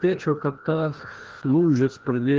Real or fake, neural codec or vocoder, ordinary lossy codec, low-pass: fake; codec, 16 kHz, 1 kbps, FreqCodec, larger model; Opus, 16 kbps; 7.2 kHz